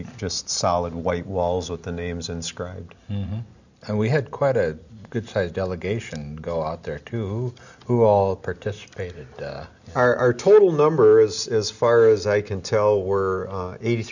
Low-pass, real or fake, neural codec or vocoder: 7.2 kHz; real; none